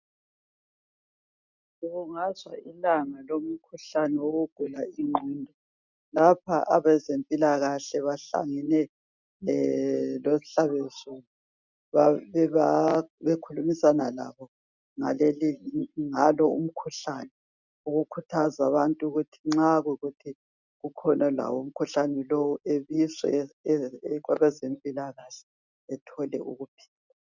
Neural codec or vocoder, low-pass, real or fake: none; 7.2 kHz; real